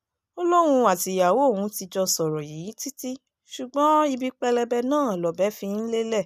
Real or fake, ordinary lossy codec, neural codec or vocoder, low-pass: real; none; none; 14.4 kHz